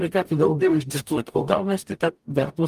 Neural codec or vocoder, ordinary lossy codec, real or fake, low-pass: codec, 44.1 kHz, 0.9 kbps, DAC; Opus, 32 kbps; fake; 14.4 kHz